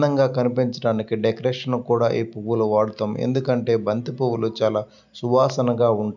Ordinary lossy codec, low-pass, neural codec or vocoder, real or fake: none; 7.2 kHz; none; real